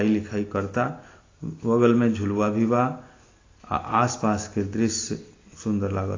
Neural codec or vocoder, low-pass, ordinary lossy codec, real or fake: none; 7.2 kHz; AAC, 32 kbps; real